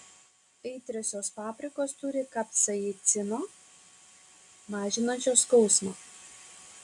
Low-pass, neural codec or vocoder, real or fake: 10.8 kHz; none; real